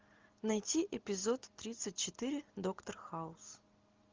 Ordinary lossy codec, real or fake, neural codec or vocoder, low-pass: Opus, 32 kbps; real; none; 7.2 kHz